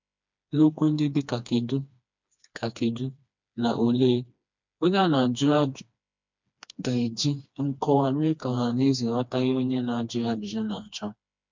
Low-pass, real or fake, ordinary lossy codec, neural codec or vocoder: 7.2 kHz; fake; MP3, 64 kbps; codec, 16 kHz, 2 kbps, FreqCodec, smaller model